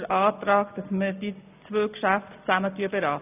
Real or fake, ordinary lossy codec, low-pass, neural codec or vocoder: real; none; 3.6 kHz; none